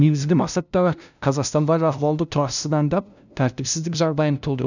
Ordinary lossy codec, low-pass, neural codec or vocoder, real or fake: none; 7.2 kHz; codec, 16 kHz, 0.5 kbps, FunCodec, trained on LibriTTS, 25 frames a second; fake